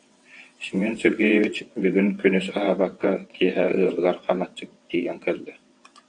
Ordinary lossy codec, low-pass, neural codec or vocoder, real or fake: AAC, 48 kbps; 9.9 kHz; vocoder, 22.05 kHz, 80 mel bands, WaveNeXt; fake